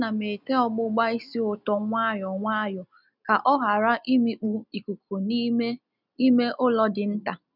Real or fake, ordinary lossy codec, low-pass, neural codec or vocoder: real; none; 5.4 kHz; none